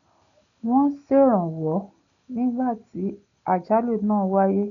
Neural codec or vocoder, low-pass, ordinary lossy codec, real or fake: none; 7.2 kHz; none; real